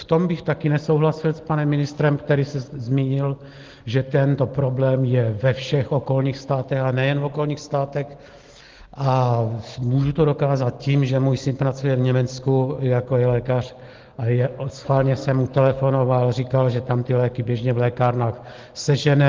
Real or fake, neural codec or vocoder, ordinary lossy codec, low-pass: real; none; Opus, 16 kbps; 7.2 kHz